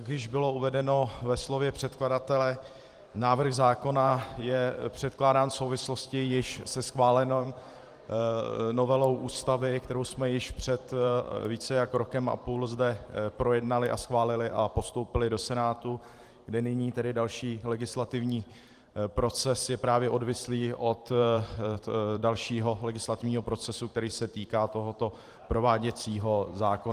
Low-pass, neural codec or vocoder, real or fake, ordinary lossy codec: 14.4 kHz; vocoder, 44.1 kHz, 128 mel bands every 512 samples, BigVGAN v2; fake; Opus, 24 kbps